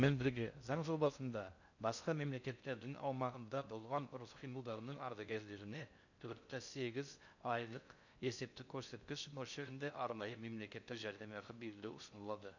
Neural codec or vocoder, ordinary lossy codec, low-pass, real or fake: codec, 16 kHz in and 24 kHz out, 0.6 kbps, FocalCodec, streaming, 4096 codes; none; 7.2 kHz; fake